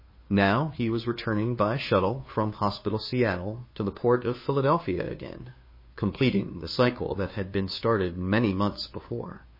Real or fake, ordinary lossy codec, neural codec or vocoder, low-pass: fake; MP3, 24 kbps; codec, 16 kHz, 2 kbps, FunCodec, trained on Chinese and English, 25 frames a second; 5.4 kHz